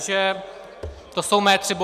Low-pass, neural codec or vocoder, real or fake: 14.4 kHz; none; real